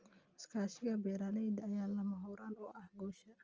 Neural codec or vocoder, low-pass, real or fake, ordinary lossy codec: none; 7.2 kHz; real; Opus, 24 kbps